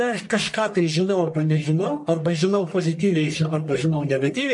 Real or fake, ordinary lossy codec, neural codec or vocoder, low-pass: fake; MP3, 48 kbps; codec, 44.1 kHz, 1.7 kbps, Pupu-Codec; 10.8 kHz